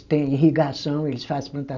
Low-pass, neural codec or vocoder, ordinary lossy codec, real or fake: 7.2 kHz; none; none; real